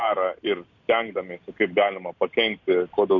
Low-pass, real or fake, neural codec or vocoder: 7.2 kHz; real; none